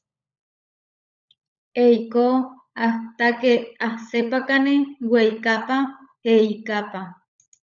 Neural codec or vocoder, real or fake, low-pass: codec, 16 kHz, 16 kbps, FunCodec, trained on LibriTTS, 50 frames a second; fake; 7.2 kHz